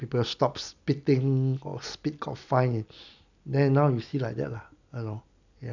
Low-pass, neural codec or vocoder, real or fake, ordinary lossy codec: 7.2 kHz; none; real; none